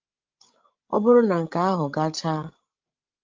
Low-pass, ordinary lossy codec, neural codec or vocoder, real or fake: 7.2 kHz; Opus, 32 kbps; codec, 16 kHz, 16 kbps, FreqCodec, larger model; fake